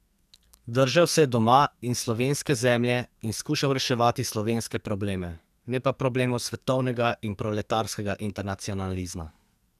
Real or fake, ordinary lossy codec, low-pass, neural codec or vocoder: fake; none; 14.4 kHz; codec, 44.1 kHz, 2.6 kbps, SNAC